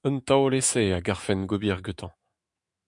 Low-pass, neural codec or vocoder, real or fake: 10.8 kHz; autoencoder, 48 kHz, 128 numbers a frame, DAC-VAE, trained on Japanese speech; fake